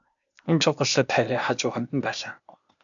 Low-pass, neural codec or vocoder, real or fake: 7.2 kHz; codec, 16 kHz, 0.8 kbps, ZipCodec; fake